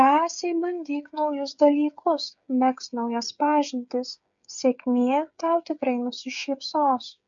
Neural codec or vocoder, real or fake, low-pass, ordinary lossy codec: codec, 16 kHz, 8 kbps, FreqCodec, smaller model; fake; 7.2 kHz; MP3, 64 kbps